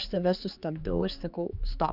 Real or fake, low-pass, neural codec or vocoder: fake; 5.4 kHz; codec, 16 kHz, 4 kbps, X-Codec, HuBERT features, trained on general audio